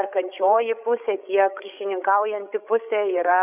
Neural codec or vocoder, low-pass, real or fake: codec, 16 kHz, 8 kbps, FreqCodec, larger model; 3.6 kHz; fake